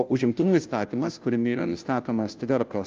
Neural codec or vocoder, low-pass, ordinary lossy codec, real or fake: codec, 16 kHz, 0.5 kbps, FunCodec, trained on Chinese and English, 25 frames a second; 7.2 kHz; Opus, 24 kbps; fake